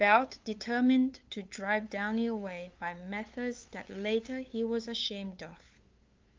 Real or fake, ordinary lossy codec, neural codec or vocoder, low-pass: fake; Opus, 32 kbps; codec, 16 kHz, 8 kbps, FunCodec, trained on LibriTTS, 25 frames a second; 7.2 kHz